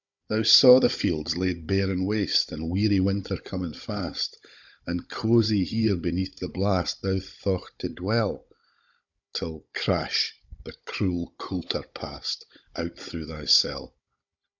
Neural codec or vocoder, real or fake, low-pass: codec, 16 kHz, 16 kbps, FunCodec, trained on Chinese and English, 50 frames a second; fake; 7.2 kHz